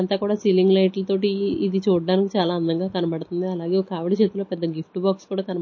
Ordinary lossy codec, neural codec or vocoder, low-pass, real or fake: MP3, 32 kbps; none; 7.2 kHz; real